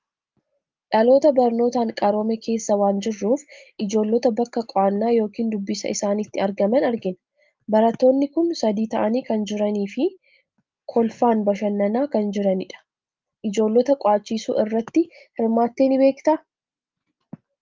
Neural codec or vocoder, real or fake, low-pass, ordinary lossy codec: none; real; 7.2 kHz; Opus, 24 kbps